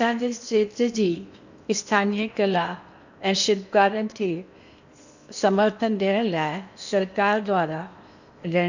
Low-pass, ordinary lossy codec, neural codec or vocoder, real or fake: 7.2 kHz; none; codec, 16 kHz in and 24 kHz out, 0.8 kbps, FocalCodec, streaming, 65536 codes; fake